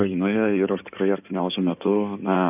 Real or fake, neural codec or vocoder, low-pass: fake; codec, 16 kHz in and 24 kHz out, 2.2 kbps, FireRedTTS-2 codec; 3.6 kHz